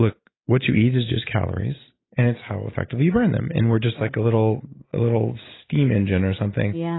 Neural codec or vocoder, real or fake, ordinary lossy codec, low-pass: none; real; AAC, 16 kbps; 7.2 kHz